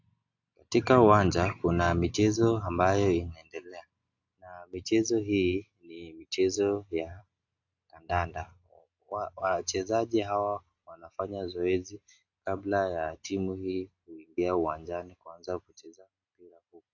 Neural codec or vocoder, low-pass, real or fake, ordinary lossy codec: none; 7.2 kHz; real; AAC, 48 kbps